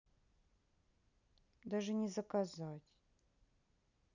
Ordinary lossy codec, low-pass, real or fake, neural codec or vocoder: none; 7.2 kHz; real; none